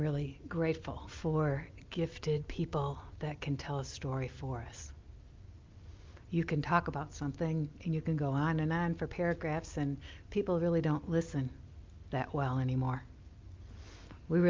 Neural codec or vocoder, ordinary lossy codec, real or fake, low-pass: none; Opus, 24 kbps; real; 7.2 kHz